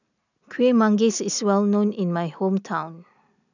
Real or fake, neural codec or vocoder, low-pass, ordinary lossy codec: real; none; 7.2 kHz; none